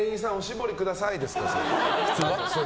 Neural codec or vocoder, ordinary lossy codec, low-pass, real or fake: none; none; none; real